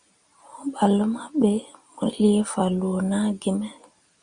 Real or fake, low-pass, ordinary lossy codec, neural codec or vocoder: real; 9.9 kHz; Opus, 64 kbps; none